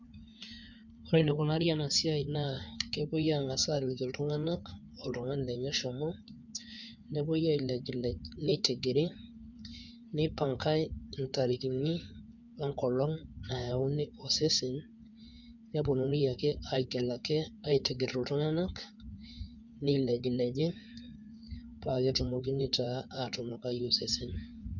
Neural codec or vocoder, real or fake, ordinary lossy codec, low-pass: codec, 16 kHz in and 24 kHz out, 2.2 kbps, FireRedTTS-2 codec; fake; none; 7.2 kHz